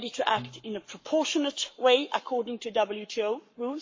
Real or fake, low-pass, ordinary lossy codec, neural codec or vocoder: fake; 7.2 kHz; MP3, 32 kbps; codec, 44.1 kHz, 7.8 kbps, Pupu-Codec